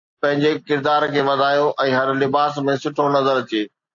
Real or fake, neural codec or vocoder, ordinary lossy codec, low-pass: real; none; AAC, 64 kbps; 7.2 kHz